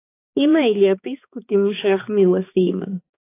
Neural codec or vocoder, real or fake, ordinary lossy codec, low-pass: codec, 16 kHz, 2 kbps, X-Codec, HuBERT features, trained on balanced general audio; fake; AAC, 24 kbps; 3.6 kHz